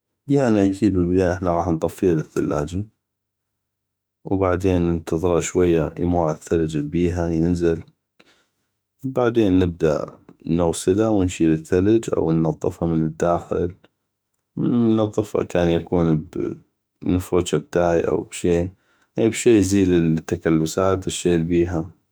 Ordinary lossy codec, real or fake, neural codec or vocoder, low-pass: none; fake; autoencoder, 48 kHz, 32 numbers a frame, DAC-VAE, trained on Japanese speech; none